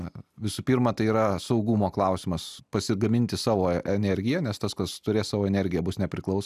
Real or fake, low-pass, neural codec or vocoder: real; 14.4 kHz; none